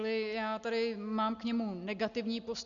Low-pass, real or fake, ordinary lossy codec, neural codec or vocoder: 7.2 kHz; real; Opus, 64 kbps; none